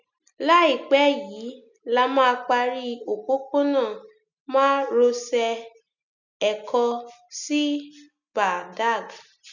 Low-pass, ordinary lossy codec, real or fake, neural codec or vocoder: 7.2 kHz; none; real; none